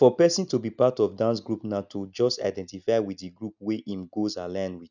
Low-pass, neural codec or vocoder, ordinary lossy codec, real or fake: 7.2 kHz; none; none; real